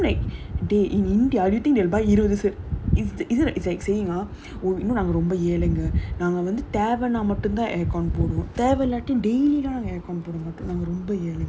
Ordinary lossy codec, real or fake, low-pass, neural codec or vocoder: none; real; none; none